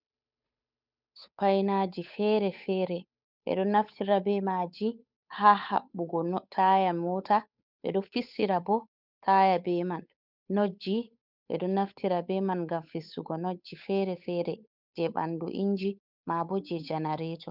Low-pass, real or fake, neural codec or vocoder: 5.4 kHz; fake; codec, 16 kHz, 8 kbps, FunCodec, trained on Chinese and English, 25 frames a second